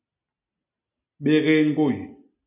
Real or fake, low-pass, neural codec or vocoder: real; 3.6 kHz; none